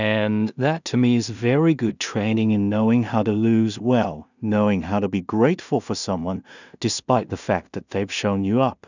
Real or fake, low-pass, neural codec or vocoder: fake; 7.2 kHz; codec, 16 kHz in and 24 kHz out, 0.4 kbps, LongCat-Audio-Codec, two codebook decoder